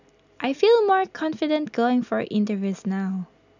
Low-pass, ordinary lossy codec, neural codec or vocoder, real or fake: 7.2 kHz; none; none; real